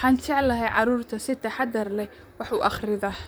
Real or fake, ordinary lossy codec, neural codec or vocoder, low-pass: fake; none; vocoder, 44.1 kHz, 128 mel bands, Pupu-Vocoder; none